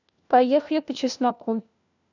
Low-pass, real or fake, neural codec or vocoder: 7.2 kHz; fake; codec, 16 kHz, 1 kbps, FunCodec, trained on LibriTTS, 50 frames a second